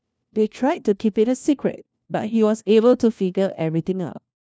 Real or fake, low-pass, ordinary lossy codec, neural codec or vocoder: fake; none; none; codec, 16 kHz, 1 kbps, FunCodec, trained on LibriTTS, 50 frames a second